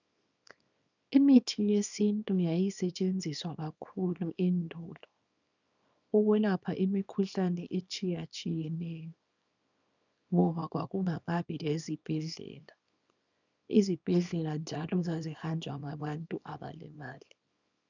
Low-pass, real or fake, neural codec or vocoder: 7.2 kHz; fake; codec, 24 kHz, 0.9 kbps, WavTokenizer, small release